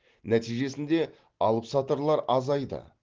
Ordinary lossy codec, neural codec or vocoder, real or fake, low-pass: Opus, 16 kbps; none; real; 7.2 kHz